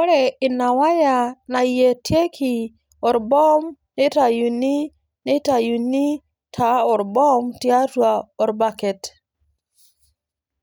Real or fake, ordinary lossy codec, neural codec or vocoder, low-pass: real; none; none; none